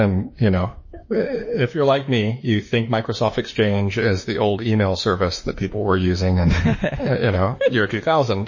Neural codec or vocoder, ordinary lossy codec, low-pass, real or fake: autoencoder, 48 kHz, 32 numbers a frame, DAC-VAE, trained on Japanese speech; MP3, 32 kbps; 7.2 kHz; fake